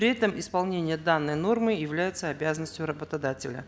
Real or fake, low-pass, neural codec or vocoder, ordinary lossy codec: real; none; none; none